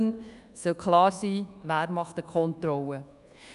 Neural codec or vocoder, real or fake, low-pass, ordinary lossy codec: codec, 24 kHz, 1.2 kbps, DualCodec; fake; 10.8 kHz; none